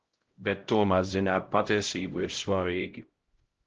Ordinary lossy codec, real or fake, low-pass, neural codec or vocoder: Opus, 16 kbps; fake; 7.2 kHz; codec, 16 kHz, 0.5 kbps, X-Codec, HuBERT features, trained on LibriSpeech